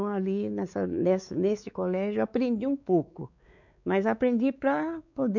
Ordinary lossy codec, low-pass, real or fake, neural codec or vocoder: none; 7.2 kHz; fake; codec, 16 kHz, 4 kbps, X-Codec, WavLM features, trained on Multilingual LibriSpeech